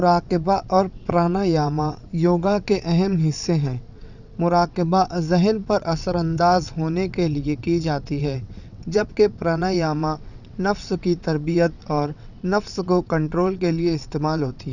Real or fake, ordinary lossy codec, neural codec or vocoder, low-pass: fake; none; vocoder, 22.05 kHz, 80 mel bands, WaveNeXt; 7.2 kHz